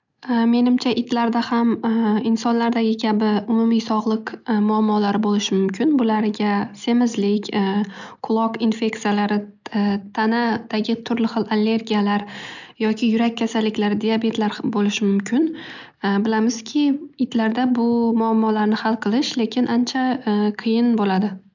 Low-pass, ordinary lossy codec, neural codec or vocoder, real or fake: 7.2 kHz; none; none; real